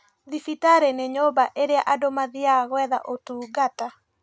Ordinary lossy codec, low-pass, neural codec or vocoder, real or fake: none; none; none; real